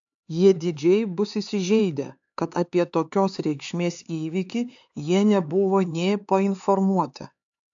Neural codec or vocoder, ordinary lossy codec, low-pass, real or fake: codec, 16 kHz, 4 kbps, X-Codec, HuBERT features, trained on LibriSpeech; AAC, 64 kbps; 7.2 kHz; fake